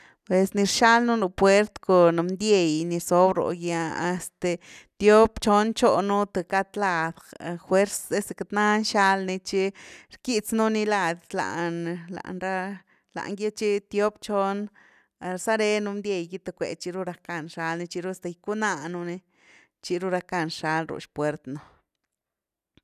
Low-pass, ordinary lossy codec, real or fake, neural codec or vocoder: 14.4 kHz; none; real; none